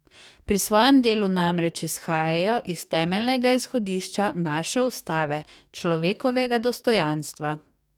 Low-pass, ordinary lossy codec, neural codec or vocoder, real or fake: 19.8 kHz; none; codec, 44.1 kHz, 2.6 kbps, DAC; fake